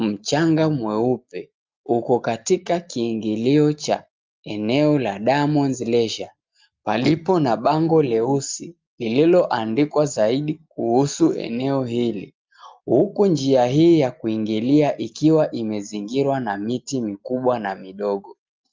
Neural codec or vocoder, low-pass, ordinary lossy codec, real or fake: none; 7.2 kHz; Opus, 24 kbps; real